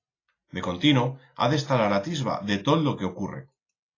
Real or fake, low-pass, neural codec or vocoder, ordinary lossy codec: real; 7.2 kHz; none; AAC, 32 kbps